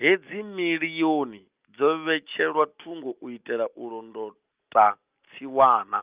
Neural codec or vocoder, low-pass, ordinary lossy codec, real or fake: none; 3.6 kHz; Opus, 24 kbps; real